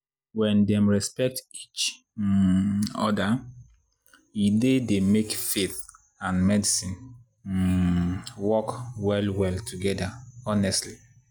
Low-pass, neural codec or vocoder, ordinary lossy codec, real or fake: none; none; none; real